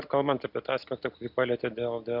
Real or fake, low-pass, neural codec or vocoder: real; 5.4 kHz; none